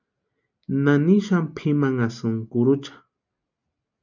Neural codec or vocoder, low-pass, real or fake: none; 7.2 kHz; real